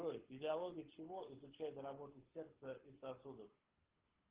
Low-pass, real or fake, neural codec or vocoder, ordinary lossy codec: 3.6 kHz; fake; codec, 24 kHz, 6 kbps, HILCodec; Opus, 16 kbps